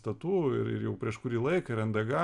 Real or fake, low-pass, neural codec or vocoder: real; 10.8 kHz; none